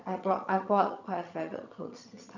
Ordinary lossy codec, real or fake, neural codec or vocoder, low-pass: none; fake; vocoder, 22.05 kHz, 80 mel bands, HiFi-GAN; 7.2 kHz